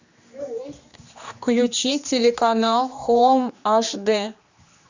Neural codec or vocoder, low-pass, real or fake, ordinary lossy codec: codec, 16 kHz, 2 kbps, X-Codec, HuBERT features, trained on general audio; 7.2 kHz; fake; Opus, 64 kbps